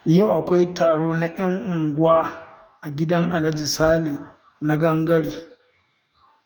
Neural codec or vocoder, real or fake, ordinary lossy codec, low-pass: codec, 44.1 kHz, 2.6 kbps, DAC; fake; none; 19.8 kHz